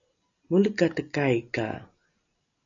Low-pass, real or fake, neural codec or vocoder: 7.2 kHz; real; none